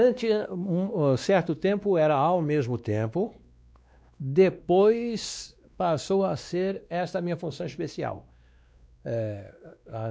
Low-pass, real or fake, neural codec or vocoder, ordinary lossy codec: none; fake; codec, 16 kHz, 2 kbps, X-Codec, WavLM features, trained on Multilingual LibriSpeech; none